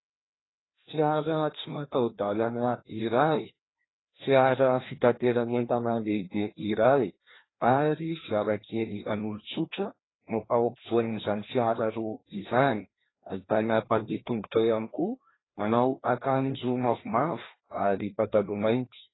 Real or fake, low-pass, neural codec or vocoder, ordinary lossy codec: fake; 7.2 kHz; codec, 16 kHz, 1 kbps, FreqCodec, larger model; AAC, 16 kbps